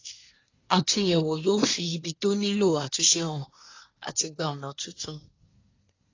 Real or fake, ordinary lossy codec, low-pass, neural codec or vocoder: fake; AAC, 32 kbps; 7.2 kHz; codec, 24 kHz, 1 kbps, SNAC